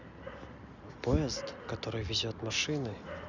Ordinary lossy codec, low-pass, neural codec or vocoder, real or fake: none; 7.2 kHz; none; real